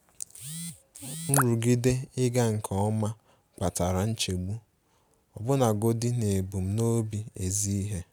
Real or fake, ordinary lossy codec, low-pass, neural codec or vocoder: real; none; none; none